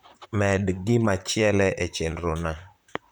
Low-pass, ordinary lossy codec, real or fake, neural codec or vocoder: none; none; fake; vocoder, 44.1 kHz, 128 mel bands, Pupu-Vocoder